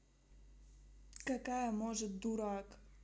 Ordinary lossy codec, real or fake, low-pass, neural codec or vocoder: none; real; none; none